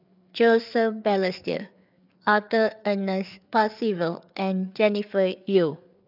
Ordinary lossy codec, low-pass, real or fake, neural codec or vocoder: none; 5.4 kHz; fake; codec, 16 kHz, 4 kbps, FreqCodec, larger model